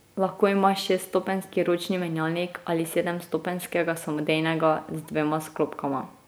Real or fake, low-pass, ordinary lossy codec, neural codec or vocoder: real; none; none; none